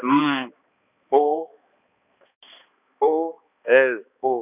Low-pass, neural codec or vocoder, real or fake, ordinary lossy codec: 3.6 kHz; codec, 16 kHz, 2 kbps, X-Codec, HuBERT features, trained on balanced general audio; fake; none